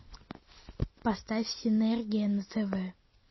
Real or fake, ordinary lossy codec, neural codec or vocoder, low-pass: real; MP3, 24 kbps; none; 7.2 kHz